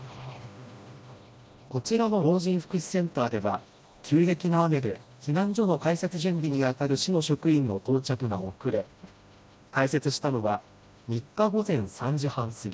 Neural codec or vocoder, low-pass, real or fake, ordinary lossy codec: codec, 16 kHz, 1 kbps, FreqCodec, smaller model; none; fake; none